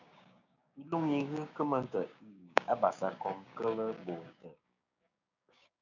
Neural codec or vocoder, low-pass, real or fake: codec, 16 kHz, 6 kbps, DAC; 7.2 kHz; fake